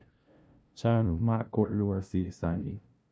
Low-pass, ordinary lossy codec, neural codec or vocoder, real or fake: none; none; codec, 16 kHz, 0.5 kbps, FunCodec, trained on LibriTTS, 25 frames a second; fake